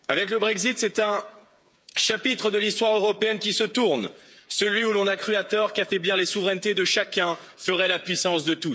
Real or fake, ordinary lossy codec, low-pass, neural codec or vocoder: fake; none; none; codec, 16 kHz, 8 kbps, FreqCodec, smaller model